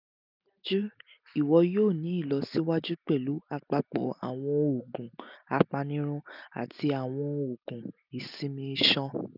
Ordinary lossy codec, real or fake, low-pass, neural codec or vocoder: none; real; 5.4 kHz; none